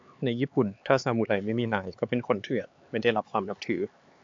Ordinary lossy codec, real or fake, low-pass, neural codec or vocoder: AAC, 48 kbps; fake; 7.2 kHz; codec, 16 kHz, 4 kbps, X-Codec, HuBERT features, trained on LibriSpeech